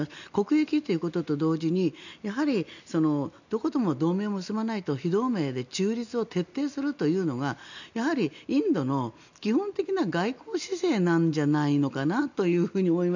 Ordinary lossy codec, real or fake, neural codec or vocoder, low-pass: none; real; none; 7.2 kHz